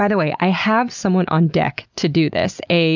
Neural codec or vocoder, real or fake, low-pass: none; real; 7.2 kHz